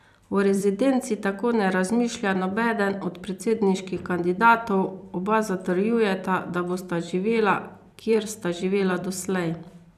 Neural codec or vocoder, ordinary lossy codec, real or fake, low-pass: vocoder, 44.1 kHz, 128 mel bands every 256 samples, BigVGAN v2; none; fake; 14.4 kHz